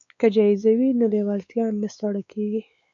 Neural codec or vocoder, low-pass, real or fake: codec, 16 kHz, 4 kbps, X-Codec, WavLM features, trained on Multilingual LibriSpeech; 7.2 kHz; fake